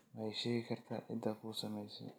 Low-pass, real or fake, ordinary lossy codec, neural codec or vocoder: none; real; none; none